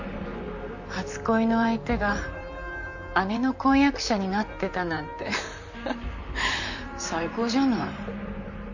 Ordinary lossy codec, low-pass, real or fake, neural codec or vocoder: none; 7.2 kHz; fake; vocoder, 44.1 kHz, 128 mel bands, Pupu-Vocoder